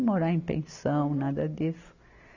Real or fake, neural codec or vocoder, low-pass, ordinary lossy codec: real; none; 7.2 kHz; none